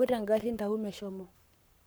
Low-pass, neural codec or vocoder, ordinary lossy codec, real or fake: none; codec, 44.1 kHz, 7.8 kbps, Pupu-Codec; none; fake